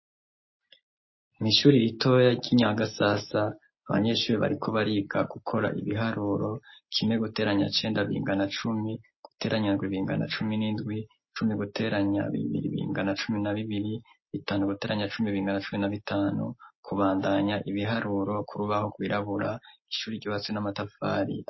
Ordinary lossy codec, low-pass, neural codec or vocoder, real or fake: MP3, 24 kbps; 7.2 kHz; none; real